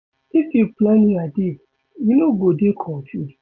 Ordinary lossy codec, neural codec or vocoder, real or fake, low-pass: none; none; real; 7.2 kHz